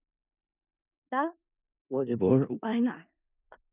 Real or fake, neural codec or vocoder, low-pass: fake; codec, 16 kHz in and 24 kHz out, 0.4 kbps, LongCat-Audio-Codec, four codebook decoder; 3.6 kHz